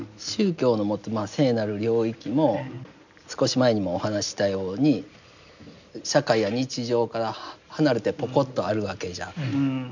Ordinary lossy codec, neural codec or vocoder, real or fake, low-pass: none; none; real; 7.2 kHz